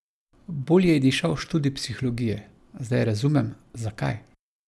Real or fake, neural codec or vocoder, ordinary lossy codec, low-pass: real; none; none; none